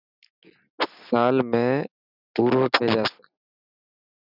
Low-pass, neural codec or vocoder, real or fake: 5.4 kHz; none; real